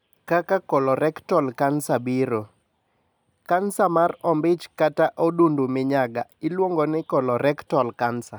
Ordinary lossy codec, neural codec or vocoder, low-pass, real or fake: none; none; none; real